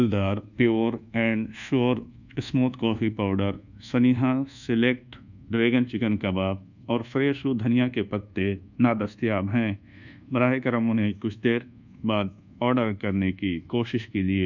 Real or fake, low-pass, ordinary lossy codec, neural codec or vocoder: fake; 7.2 kHz; none; codec, 24 kHz, 1.2 kbps, DualCodec